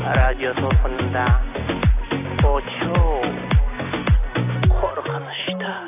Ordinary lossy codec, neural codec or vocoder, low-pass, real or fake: AAC, 24 kbps; none; 3.6 kHz; real